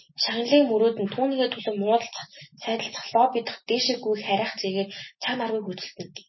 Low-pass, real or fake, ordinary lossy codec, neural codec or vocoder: 7.2 kHz; real; MP3, 24 kbps; none